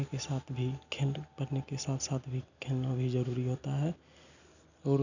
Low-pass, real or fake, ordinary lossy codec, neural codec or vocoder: 7.2 kHz; real; none; none